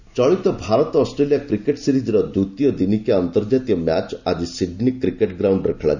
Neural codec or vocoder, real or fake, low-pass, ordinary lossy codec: none; real; 7.2 kHz; none